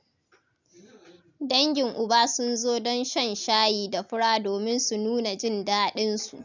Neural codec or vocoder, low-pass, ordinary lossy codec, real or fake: none; 7.2 kHz; none; real